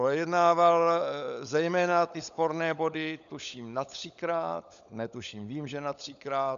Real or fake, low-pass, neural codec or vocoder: fake; 7.2 kHz; codec, 16 kHz, 16 kbps, FunCodec, trained on LibriTTS, 50 frames a second